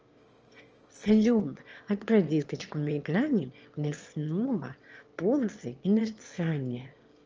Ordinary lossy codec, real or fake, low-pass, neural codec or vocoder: Opus, 24 kbps; fake; 7.2 kHz; autoencoder, 22.05 kHz, a latent of 192 numbers a frame, VITS, trained on one speaker